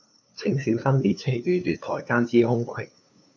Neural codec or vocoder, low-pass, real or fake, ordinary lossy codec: codec, 16 kHz, 2 kbps, FunCodec, trained on LibriTTS, 25 frames a second; 7.2 kHz; fake; MP3, 48 kbps